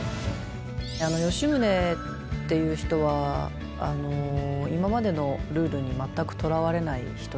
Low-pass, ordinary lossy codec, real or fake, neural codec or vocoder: none; none; real; none